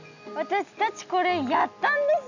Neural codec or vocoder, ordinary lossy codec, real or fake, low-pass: none; none; real; 7.2 kHz